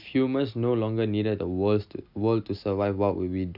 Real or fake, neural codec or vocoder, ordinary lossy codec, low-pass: real; none; none; 5.4 kHz